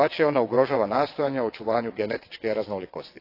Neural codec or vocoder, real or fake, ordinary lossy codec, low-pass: vocoder, 22.05 kHz, 80 mel bands, WaveNeXt; fake; AAC, 32 kbps; 5.4 kHz